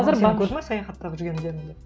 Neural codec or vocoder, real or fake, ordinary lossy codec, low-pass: none; real; none; none